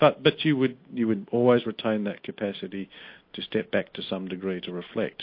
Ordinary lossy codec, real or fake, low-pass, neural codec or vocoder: MP3, 32 kbps; real; 5.4 kHz; none